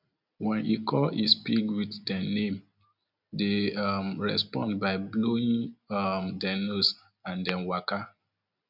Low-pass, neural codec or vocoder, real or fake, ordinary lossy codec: 5.4 kHz; none; real; none